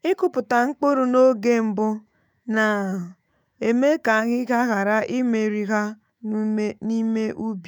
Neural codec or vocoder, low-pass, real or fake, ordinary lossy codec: autoencoder, 48 kHz, 128 numbers a frame, DAC-VAE, trained on Japanese speech; none; fake; none